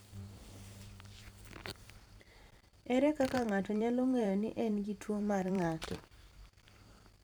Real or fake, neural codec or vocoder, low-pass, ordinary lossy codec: fake; vocoder, 44.1 kHz, 128 mel bands every 512 samples, BigVGAN v2; none; none